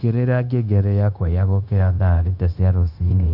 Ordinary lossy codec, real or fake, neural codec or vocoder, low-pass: none; fake; codec, 16 kHz, 0.9 kbps, LongCat-Audio-Codec; 5.4 kHz